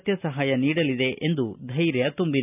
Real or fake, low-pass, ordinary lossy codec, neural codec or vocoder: real; 3.6 kHz; none; none